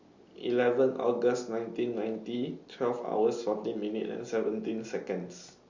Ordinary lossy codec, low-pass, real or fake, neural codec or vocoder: Opus, 64 kbps; 7.2 kHz; real; none